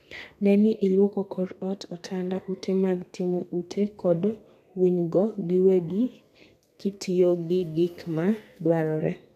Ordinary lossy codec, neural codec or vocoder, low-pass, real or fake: none; codec, 32 kHz, 1.9 kbps, SNAC; 14.4 kHz; fake